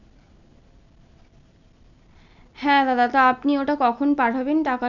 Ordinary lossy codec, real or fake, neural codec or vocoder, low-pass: none; real; none; 7.2 kHz